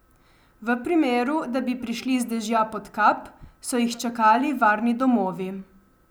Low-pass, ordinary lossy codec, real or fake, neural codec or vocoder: none; none; real; none